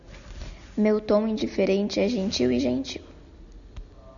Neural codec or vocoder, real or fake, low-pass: none; real; 7.2 kHz